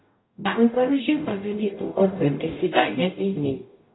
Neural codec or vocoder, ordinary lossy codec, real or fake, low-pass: codec, 44.1 kHz, 0.9 kbps, DAC; AAC, 16 kbps; fake; 7.2 kHz